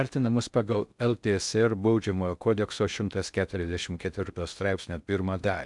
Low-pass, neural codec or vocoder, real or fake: 10.8 kHz; codec, 16 kHz in and 24 kHz out, 0.6 kbps, FocalCodec, streaming, 2048 codes; fake